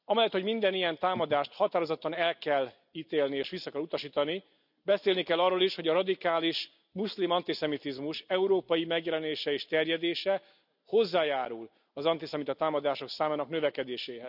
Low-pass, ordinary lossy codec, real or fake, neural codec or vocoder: 5.4 kHz; none; real; none